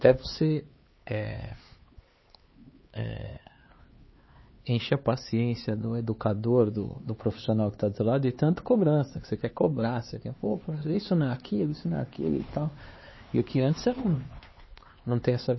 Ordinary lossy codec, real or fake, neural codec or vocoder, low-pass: MP3, 24 kbps; fake; codec, 16 kHz, 4 kbps, X-Codec, HuBERT features, trained on LibriSpeech; 7.2 kHz